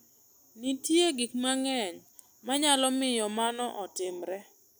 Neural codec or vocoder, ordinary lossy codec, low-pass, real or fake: none; none; none; real